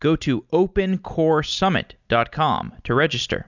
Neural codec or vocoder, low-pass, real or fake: none; 7.2 kHz; real